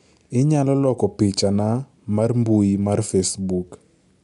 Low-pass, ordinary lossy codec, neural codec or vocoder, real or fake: 10.8 kHz; none; none; real